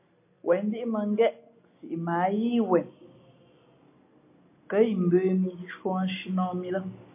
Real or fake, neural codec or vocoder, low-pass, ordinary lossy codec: real; none; 3.6 kHz; MP3, 24 kbps